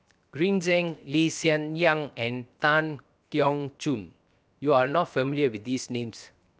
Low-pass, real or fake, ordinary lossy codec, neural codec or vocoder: none; fake; none; codec, 16 kHz, 0.7 kbps, FocalCodec